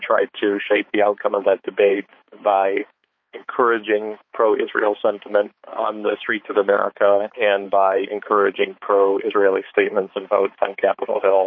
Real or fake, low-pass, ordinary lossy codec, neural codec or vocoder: fake; 7.2 kHz; MP3, 32 kbps; codec, 16 kHz, 4 kbps, X-Codec, HuBERT features, trained on balanced general audio